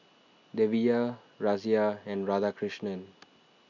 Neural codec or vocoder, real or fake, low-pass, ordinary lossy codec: none; real; 7.2 kHz; none